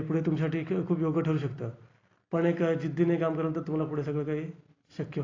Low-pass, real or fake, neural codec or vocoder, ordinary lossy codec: 7.2 kHz; real; none; AAC, 32 kbps